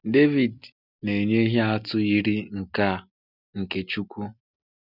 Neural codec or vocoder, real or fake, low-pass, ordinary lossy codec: none; real; 5.4 kHz; none